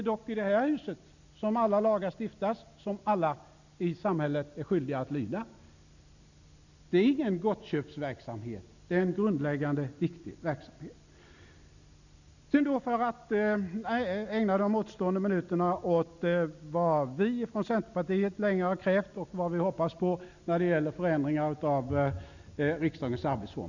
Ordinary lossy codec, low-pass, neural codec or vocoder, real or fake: none; 7.2 kHz; none; real